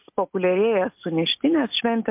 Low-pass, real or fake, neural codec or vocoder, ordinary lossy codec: 3.6 kHz; real; none; MP3, 32 kbps